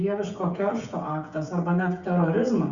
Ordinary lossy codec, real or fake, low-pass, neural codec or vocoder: Opus, 64 kbps; fake; 7.2 kHz; codec, 16 kHz, 16 kbps, FreqCodec, smaller model